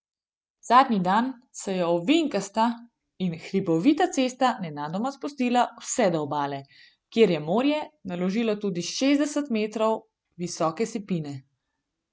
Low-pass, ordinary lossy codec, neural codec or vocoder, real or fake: none; none; none; real